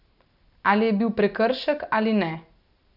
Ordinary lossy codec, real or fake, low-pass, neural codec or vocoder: none; real; 5.4 kHz; none